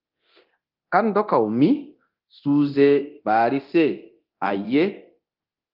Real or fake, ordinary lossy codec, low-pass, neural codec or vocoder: fake; Opus, 32 kbps; 5.4 kHz; codec, 24 kHz, 0.9 kbps, DualCodec